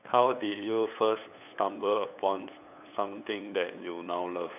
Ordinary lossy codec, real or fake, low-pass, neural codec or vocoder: none; fake; 3.6 kHz; codec, 16 kHz, 8 kbps, FunCodec, trained on LibriTTS, 25 frames a second